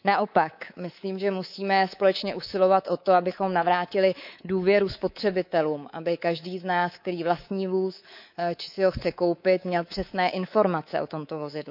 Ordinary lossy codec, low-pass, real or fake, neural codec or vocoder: none; 5.4 kHz; fake; codec, 24 kHz, 3.1 kbps, DualCodec